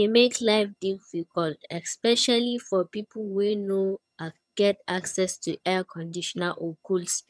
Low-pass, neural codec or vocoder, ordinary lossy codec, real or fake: none; vocoder, 22.05 kHz, 80 mel bands, HiFi-GAN; none; fake